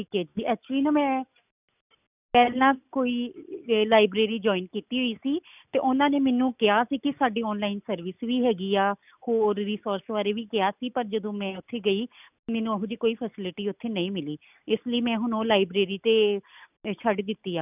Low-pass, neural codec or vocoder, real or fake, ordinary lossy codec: 3.6 kHz; none; real; none